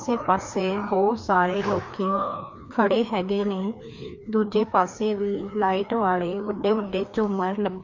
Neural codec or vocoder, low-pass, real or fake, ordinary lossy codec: codec, 16 kHz, 2 kbps, FreqCodec, larger model; 7.2 kHz; fake; MP3, 48 kbps